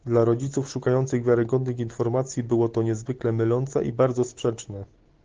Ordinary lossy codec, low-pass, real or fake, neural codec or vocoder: Opus, 16 kbps; 7.2 kHz; real; none